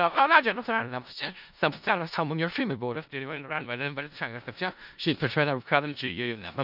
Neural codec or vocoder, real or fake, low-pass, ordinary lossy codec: codec, 16 kHz in and 24 kHz out, 0.4 kbps, LongCat-Audio-Codec, four codebook decoder; fake; 5.4 kHz; none